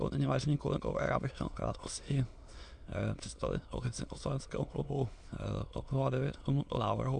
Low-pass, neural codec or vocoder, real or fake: 9.9 kHz; autoencoder, 22.05 kHz, a latent of 192 numbers a frame, VITS, trained on many speakers; fake